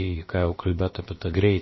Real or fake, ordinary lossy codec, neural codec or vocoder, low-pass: fake; MP3, 24 kbps; codec, 16 kHz, about 1 kbps, DyCAST, with the encoder's durations; 7.2 kHz